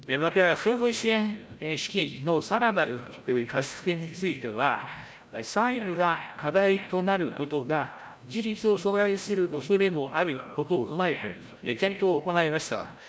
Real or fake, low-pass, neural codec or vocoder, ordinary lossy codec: fake; none; codec, 16 kHz, 0.5 kbps, FreqCodec, larger model; none